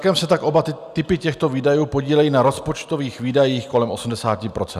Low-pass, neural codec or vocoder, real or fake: 14.4 kHz; none; real